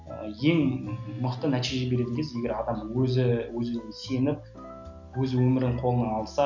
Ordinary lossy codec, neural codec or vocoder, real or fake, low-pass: none; none; real; 7.2 kHz